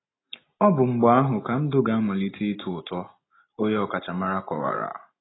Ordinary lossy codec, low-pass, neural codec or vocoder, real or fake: AAC, 16 kbps; 7.2 kHz; none; real